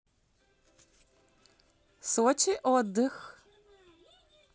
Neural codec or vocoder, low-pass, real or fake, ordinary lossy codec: none; none; real; none